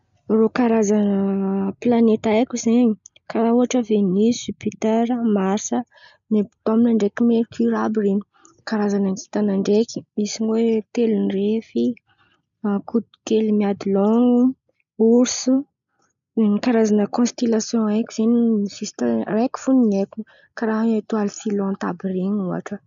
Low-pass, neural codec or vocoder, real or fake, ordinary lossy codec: 7.2 kHz; none; real; none